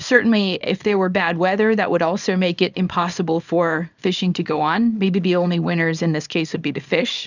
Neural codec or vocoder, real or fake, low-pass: codec, 24 kHz, 0.9 kbps, WavTokenizer, small release; fake; 7.2 kHz